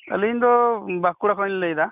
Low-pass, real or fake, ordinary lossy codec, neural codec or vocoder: 3.6 kHz; real; none; none